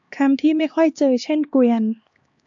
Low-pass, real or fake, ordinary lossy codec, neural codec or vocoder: 7.2 kHz; fake; AAC, 64 kbps; codec, 16 kHz, 2 kbps, X-Codec, HuBERT features, trained on LibriSpeech